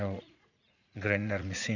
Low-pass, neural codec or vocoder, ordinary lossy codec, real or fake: 7.2 kHz; vocoder, 44.1 kHz, 80 mel bands, Vocos; none; fake